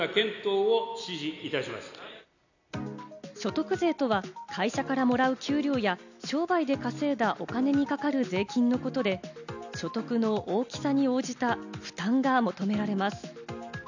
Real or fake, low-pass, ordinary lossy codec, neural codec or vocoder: real; 7.2 kHz; none; none